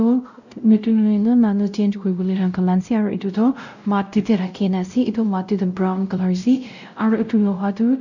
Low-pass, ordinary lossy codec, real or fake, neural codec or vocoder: 7.2 kHz; none; fake; codec, 16 kHz, 0.5 kbps, X-Codec, WavLM features, trained on Multilingual LibriSpeech